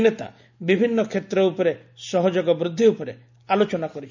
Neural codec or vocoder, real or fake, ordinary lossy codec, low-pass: none; real; none; 7.2 kHz